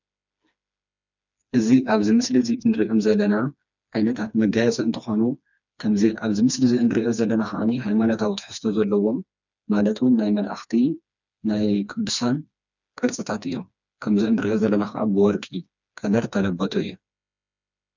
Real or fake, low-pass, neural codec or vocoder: fake; 7.2 kHz; codec, 16 kHz, 2 kbps, FreqCodec, smaller model